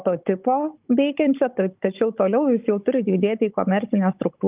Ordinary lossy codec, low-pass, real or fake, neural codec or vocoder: Opus, 32 kbps; 3.6 kHz; fake; codec, 16 kHz, 16 kbps, FunCodec, trained on Chinese and English, 50 frames a second